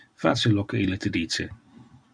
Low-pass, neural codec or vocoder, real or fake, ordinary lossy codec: 9.9 kHz; vocoder, 44.1 kHz, 128 mel bands every 256 samples, BigVGAN v2; fake; Opus, 64 kbps